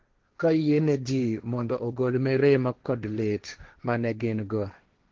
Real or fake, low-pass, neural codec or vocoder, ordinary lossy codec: fake; 7.2 kHz; codec, 16 kHz, 1.1 kbps, Voila-Tokenizer; Opus, 16 kbps